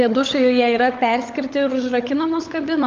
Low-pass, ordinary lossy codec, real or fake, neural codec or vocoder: 7.2 kHz; Opus, 32 kbps; fake; codec, 16 kHz, 16 kbps, FunCodec, trained on LibriTTS, 50 frames a second